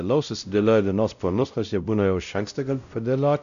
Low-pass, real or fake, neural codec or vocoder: 7.2 kHz; fake; codec, 16 kHz, 0.5 kbps, X-Codec, WavLM features, trained on Multilingual LibriSpeech